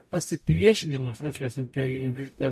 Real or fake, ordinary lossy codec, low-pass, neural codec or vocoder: fake; MP3, 64 kbps; 14.4 kHz; codec, 44.1 kHz, 0.9 kbps, DAC